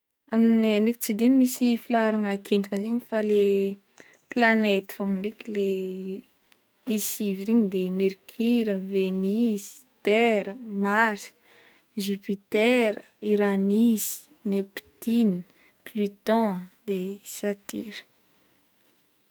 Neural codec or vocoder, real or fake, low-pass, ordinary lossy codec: codec, 44.1 kHz, 2.6 kbps, SNAC; fake; none; none